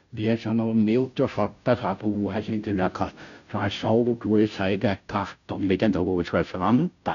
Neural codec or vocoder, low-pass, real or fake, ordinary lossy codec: codec, 16 kHz, 0.5 kbps, FunCodec, trained on Chinese and English, 25 frames a second; 7.2 kHz; fake; none